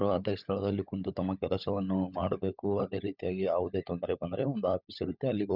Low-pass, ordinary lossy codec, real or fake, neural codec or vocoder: 5.4 kHz; none; fake; codec, 16 kHz, 16 kbps, FunCodec, trained on LibriTTS, 50 frames a second